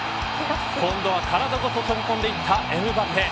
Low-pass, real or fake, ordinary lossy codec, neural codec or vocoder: none; real; none; none